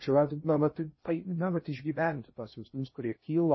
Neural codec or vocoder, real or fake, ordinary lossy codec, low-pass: codec, 16 kHz in and 24 kHz out, 0.6 kbps, FocalCodec, streaming, 2048 codes; fake; MP3, 24 kbps; 7.2 kHz